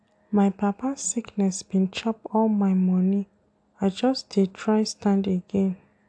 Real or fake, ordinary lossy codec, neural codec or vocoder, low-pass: real; AAC, 64 kbps; none; 9.9 kHz